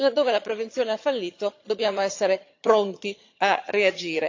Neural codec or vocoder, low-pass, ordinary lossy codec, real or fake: vocoder, 22.05 kHz, 80 mel bands, HiFi-GAN; 7.2 kHz; AAC, 48 kbps; fake